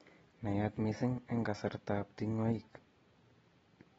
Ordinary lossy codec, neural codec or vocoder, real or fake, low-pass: AAC, 24 kbps; none; real; 19.8 kHz